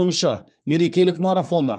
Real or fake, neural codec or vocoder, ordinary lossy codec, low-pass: fake; codec, 24 kHz, 1 kbps, SNAC; none; 9.9 kHz